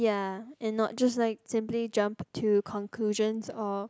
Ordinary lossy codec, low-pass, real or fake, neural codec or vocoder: none; none; real; none